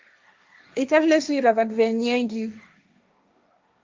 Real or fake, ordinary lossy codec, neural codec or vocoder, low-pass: fake; Opus, 24 kbps; codec, 16 kHz, 1.1 kbps, Voila-Tokenizer; 7.2 kHz